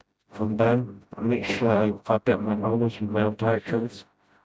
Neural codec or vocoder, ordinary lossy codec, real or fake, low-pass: codec, 16 kHz, 0.5 kbps, FreqCodec, smaller model; none; fake; none